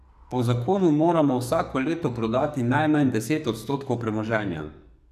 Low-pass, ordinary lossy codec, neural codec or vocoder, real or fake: 14.4 kHz; none; codec, 44.1 kHz, 2.6 kbps, SNAC; fake